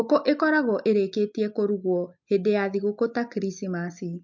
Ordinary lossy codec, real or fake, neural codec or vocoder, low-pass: MP3, 48 kbps; real; none; 7.2 kHz